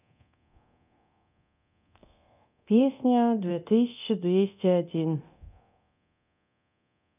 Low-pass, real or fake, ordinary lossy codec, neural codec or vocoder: 3.6 kHz; fake; none; codec, 24 kHz, 0.9 kbps, DualCodec